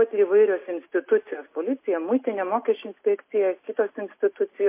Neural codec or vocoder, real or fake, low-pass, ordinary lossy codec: none; real; 3.6 kHz; MP3, 24 kbps